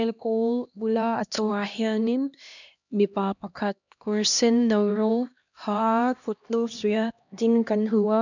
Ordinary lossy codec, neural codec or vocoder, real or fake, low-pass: none; codec, 16 kHz, 1 kbps, X-Codec, HuBERT features, trained on LibriSpeech; fake; 7.2 kHz